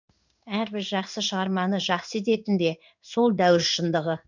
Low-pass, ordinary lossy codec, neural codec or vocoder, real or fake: 7.2 kHz; none; codec, 16 kHz in and 24 kHz out, 1 kbps, XY-Tokenizer; fake